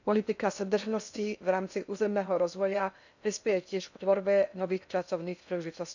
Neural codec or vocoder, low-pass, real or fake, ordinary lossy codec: codec, 16 kHz in and 24 kHz out, 0.6 kbps, FocalCodec, streaming, 2048 codes; 7.2 kHz; fake; none